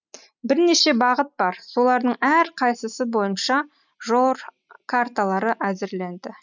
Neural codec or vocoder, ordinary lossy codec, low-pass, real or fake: none; none; 7.2 kHz; real